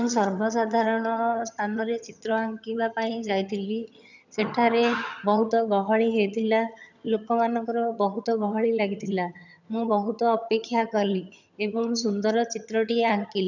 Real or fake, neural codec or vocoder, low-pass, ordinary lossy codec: fake; vocoder, 22.05 kHz, 80 mel bands, HiFi-GAN; 7.2 kHz; none